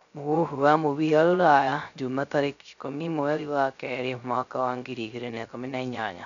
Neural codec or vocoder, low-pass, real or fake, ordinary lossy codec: codec, 16 kHz, 0.3 kbps, FocalCodec; 7.2 kHz; fake; none